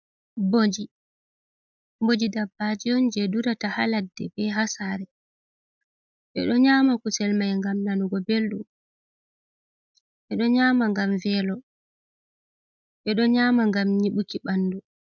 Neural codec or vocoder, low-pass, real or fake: none; 7.2 kHz; real